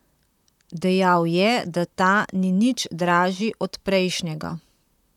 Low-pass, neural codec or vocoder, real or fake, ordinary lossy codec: 19.8 kHz; vocoder, 44.1 kHz, 128 mel bands, Pupu-Vocoder; fake; none